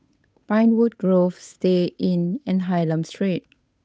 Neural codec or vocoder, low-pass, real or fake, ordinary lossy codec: codec, 16 kHz, 8 kbps, FunCodec, trained on Chinese and English, 25 frames a second; none; fake; none